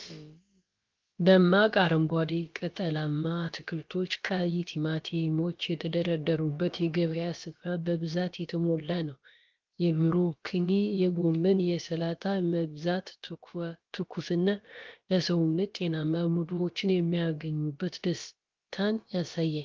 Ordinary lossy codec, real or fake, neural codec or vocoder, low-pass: Opus, 32 kbps; fake; codec, 16 kHz, about 1 kbps, DyCAST, with the encoder's durations; 7.2 kHz